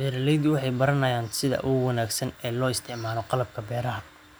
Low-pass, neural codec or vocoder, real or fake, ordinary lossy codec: none; none; real; none